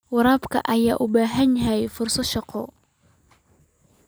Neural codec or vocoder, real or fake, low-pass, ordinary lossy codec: none; real; none; none